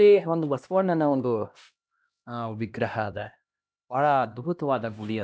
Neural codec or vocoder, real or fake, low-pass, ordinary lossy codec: codec, 16 kHz, 1 kbps, X-Codec, HuBERT features, trained on LibriSpeech; fake; none; none